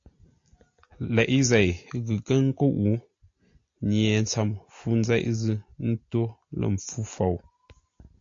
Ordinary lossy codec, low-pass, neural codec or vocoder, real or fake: AAC, 48 kbps; 7.2 kHz; none; real